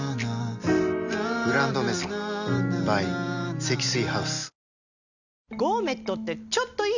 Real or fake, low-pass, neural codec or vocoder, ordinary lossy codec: real; 7.2 kHz; none; none